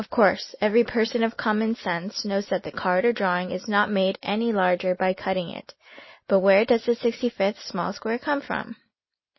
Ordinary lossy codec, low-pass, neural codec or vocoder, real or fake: MP3, 24 kbps; 7.2 kHz; none; real